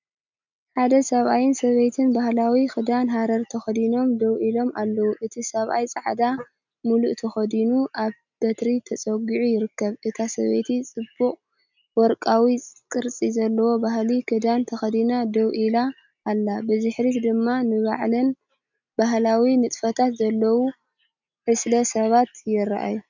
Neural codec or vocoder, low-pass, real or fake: none; 7.2 kHz; real